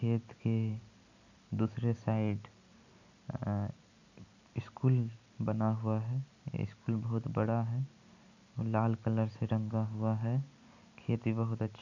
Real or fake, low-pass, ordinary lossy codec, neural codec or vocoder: real; 7.2 kHz; none; none